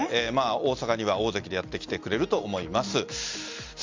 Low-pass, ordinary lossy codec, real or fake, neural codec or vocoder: 7.2 kHz; MP3, 64 kbps; real; none